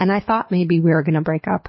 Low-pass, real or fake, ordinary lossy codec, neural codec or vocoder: 7.2 kHz; fake; MP3, 24 kbps; codec, 24 kHz, 6 kbps, HILCodec